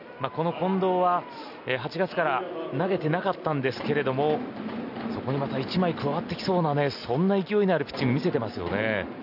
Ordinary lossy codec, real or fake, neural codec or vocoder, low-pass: none; real; none; 5.4 kHz